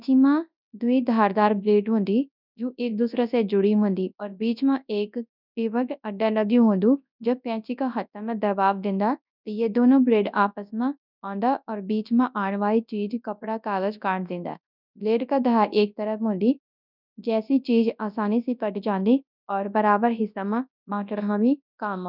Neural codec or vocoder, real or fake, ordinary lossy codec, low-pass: codec, 24 kHz, 0.9 kbps, WavTokenizer, large speech release; fake; none; 5.4 kHz